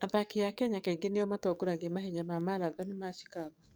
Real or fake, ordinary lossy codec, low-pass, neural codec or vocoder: fake; none; none; codec, 44.1 kHz, 7.8 kbps, DAC